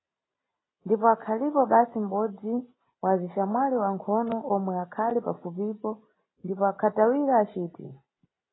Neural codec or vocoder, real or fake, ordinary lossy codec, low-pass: none; real; AAC, 16 kbps; 7.2 kHz